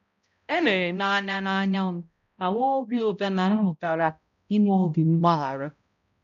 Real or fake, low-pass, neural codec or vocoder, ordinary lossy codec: fake; 7.2 kHz; codec, 16 kHz, 0.5 kbps, X-Codec, HuBERT features, trained on balanced general audio; none